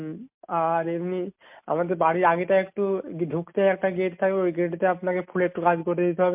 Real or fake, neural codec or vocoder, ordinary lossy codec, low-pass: real; none; MP3, 32 kbps; 3.6 kHz